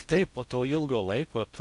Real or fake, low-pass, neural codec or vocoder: fake; 10.8 kHz; codec, 16 kHz in and 24 kHz out, 0.6 kbps, FocalCodec, streaming, 4096 codes